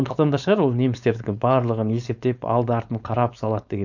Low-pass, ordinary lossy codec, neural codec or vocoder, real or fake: 7.2 kHz; none; codec, 16 kHz, 4.8 kbps, FACodec; fake